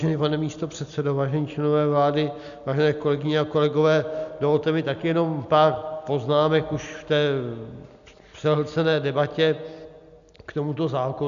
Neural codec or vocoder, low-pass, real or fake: none; 7.2 kHz; real